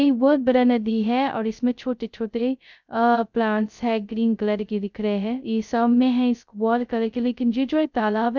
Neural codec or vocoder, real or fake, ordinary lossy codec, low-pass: codec, 16 kHz, 0.2 kbps, FocalCodec; fake; none; 7.2 kHz